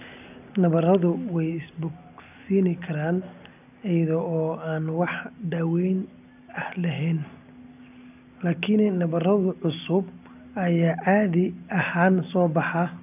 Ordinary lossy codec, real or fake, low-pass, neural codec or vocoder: none; real; 3.6 kHz; none